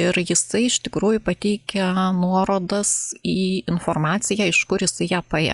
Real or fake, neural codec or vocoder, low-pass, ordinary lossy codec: real; none; 10.8 kHz; Opus, 64 kbps